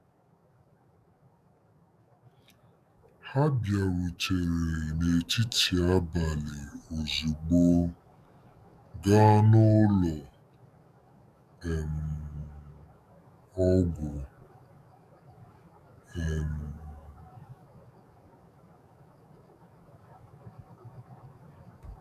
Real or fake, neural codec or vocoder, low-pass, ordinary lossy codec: fake; codec, 44.1 kHz, 7.8 kbps, DAC; 14.4 kHz; none